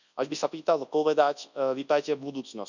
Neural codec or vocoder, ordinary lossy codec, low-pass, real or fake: codec, 24 kHz, 0.9 kbps, WavTokenizer, large speech release; none; 7.2 kHz; fake